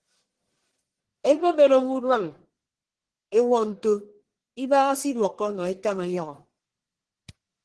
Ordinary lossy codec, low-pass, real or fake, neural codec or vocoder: Opus, 16 kbps; 10.8 kHz; fake; codec, 44.1 kHz, 1.7 kbps, Pupu-Codec